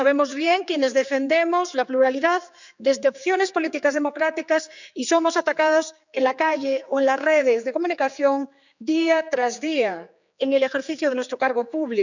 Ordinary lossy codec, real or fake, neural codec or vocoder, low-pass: none; fake; codec, 16 kHz, 4 kbps, X-Codec, HuBERT features, trained on general audio; 7.2 kHz